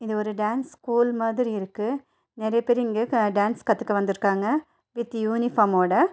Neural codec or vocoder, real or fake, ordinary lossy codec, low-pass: none; real; none; none